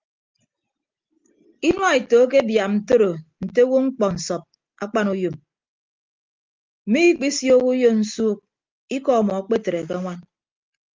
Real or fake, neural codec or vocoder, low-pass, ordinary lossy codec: real; none; 7.2 kHz; Opus, 32 kbps